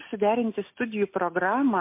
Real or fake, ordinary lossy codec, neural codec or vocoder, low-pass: real; MP3, 24 kbps; none; 3.6 kHz